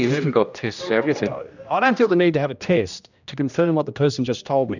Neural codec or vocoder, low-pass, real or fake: codec, 16 kHz, 1 kbps, X-Codec, HuBERT features, trained on general audio; 7.2 kHz; fake